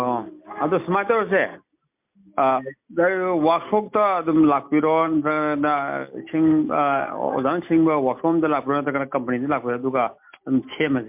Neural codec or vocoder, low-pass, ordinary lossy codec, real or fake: none; 3.6 kHz; MP3, 32 kbps; real